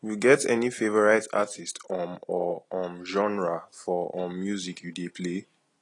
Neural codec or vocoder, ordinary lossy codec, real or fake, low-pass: none; AAC, 32 kbps; real; 10.8 kHz